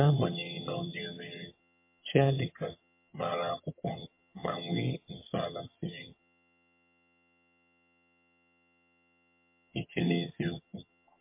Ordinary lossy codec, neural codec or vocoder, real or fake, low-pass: MP3, 24 kbps; vocoder, 22.05 kHz, 80 mel bands, HiFi-GAN; fake; 3.6 kHz